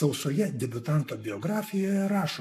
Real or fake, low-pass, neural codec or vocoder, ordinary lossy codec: fake; 14.4 kHz; codec, 44.1 kHz, 7.8 kbps, Pupu-Codec; AAC, 96 kbps